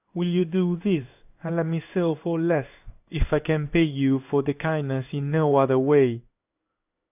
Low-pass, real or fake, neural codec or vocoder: 3.6 kHz; fake; codec, 16 kHz in and 24 kHz out, 1 kbps, XY-Tokenizer